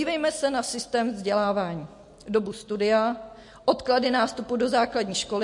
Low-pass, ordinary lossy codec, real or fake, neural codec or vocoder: 10.8 kHz; MP3, 48 kbps; real; none